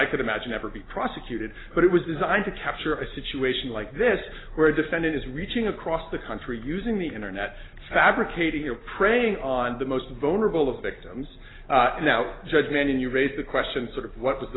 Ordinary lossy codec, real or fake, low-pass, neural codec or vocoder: AAC, 16 kbps; real; 7.2 kHz; none